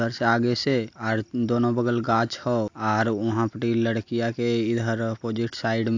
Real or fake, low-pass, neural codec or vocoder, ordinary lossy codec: real; 7.2 kHz; none; AAC, 48 kbps